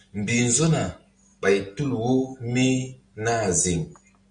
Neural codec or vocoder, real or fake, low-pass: none; real; 9.9 kHz